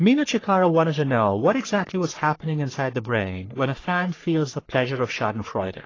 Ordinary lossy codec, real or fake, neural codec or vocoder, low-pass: AAC, 32 kbps; fake; codec, 44.1 kHz, 3.4 kbps, Pupu-Codec; 7.2 kHz